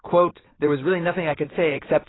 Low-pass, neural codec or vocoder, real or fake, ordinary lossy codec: 7.2 kHz; codec, 16 kHz in and 24 kHz out, 2.2 kbps, FireRedTTS-2 codec; fake; AAC, 16 kbps